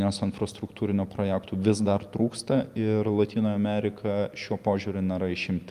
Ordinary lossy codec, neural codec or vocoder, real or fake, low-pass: Opus, 32 kbps; none; real; 14.4 kHz